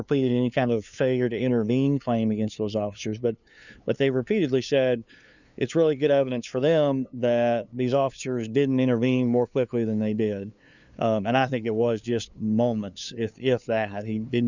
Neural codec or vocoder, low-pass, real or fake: codec, 16 kHz, 2 kbps, FunCodec, trained on LibriTTS, 25 frames a second; 7.2 kHz; fake